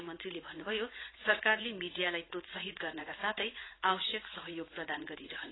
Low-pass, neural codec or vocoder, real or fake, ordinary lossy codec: 7.2 kHz; vocoder, 44.1 kHz, 80 mel bands, Vocos; fake; AAC, 16 kbps